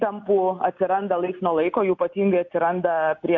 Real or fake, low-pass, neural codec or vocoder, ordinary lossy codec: real; 7.2 kHz; none; MP3, 64 kbps